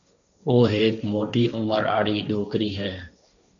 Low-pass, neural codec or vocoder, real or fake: 7.2 kHz; codec, 16 kHz, 1.1 kbps, Voila-Tokenizer; fake